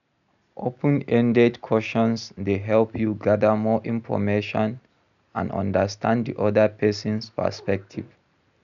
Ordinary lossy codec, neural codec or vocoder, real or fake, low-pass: none; none; real; 7.2 kHz